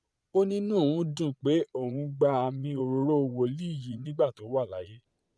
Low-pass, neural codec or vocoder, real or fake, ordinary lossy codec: none; vocoder, 22.05 kHz, 80 mel bands, Vocos; fake; none